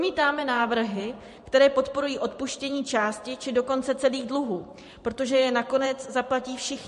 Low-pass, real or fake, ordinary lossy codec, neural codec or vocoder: 14.4 kHz; fake; MP3, 48 kbps; vocoder, 44.1 kHz, 128 mel bands every 512 samples, BigVGAN v2